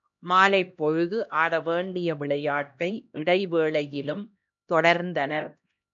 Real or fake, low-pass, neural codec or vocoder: fake; 7.2 kHz; codec, 16 kHz, 1 kbps, X-Codec, HuBERT features, trained on LibriSpeech